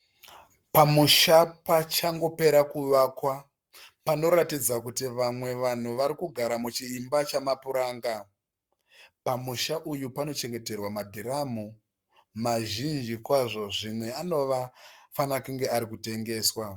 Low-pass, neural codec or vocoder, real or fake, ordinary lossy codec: 19.8 kHz; codec, 44.1 kHz, 7.8 kbps, Pupu-Codec; fake; Opus, 64 kbps